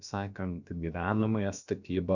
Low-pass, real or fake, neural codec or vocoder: 7.2 kHz; fake; codec, 16 kHz, about 1 kbps, DyCAST, with the encoder's durations